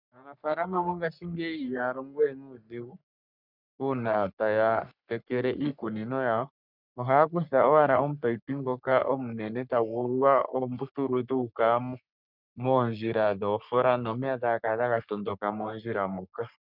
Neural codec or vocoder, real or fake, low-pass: codec, 44.1 kHz, 3.4 kbps, Pupu-Codec; fake; 5.4 kHz